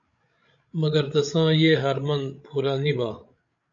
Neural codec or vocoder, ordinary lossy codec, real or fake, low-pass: codec, 16 kHz, 16 kbps, FreqCodec, larger model; AAC, 64 kbps; fake; 7.2 kHz